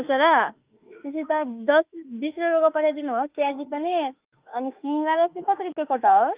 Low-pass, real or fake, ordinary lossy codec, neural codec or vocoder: 3.6 kHz; fake; Opus, 32 kbps; autoencoder, 48 kHz, 32 numbers a frame, DAC-VAE, trained on Japanese speech